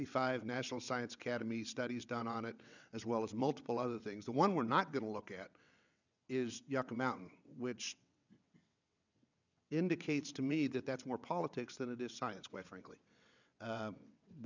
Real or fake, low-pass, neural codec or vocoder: fake; 7.2 kHz; vocoder, 22.05 kHz, 80 mel bands, WaveNeXt